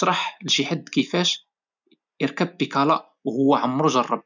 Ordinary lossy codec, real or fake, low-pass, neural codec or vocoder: none; real; 7.2 kHz; none